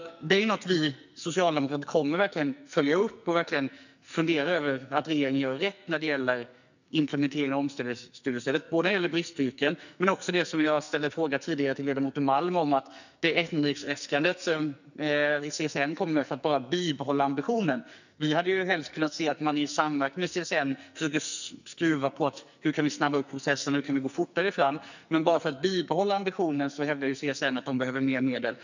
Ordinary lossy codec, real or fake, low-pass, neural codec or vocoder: none; fake; 7.2 kHz; codec, 44.1 kHz, 2.6 kbps, SNAC